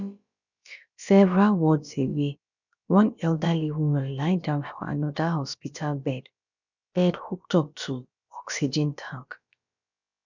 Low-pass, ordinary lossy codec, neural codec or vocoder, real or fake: 7.2 kHz; none; codec, 16 kHz, about 1 kbps, DyCAST, with the encoder's durations; fake